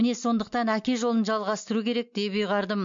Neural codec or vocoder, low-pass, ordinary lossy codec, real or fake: none; 7.2 kHz; MP3, 48 kbps; real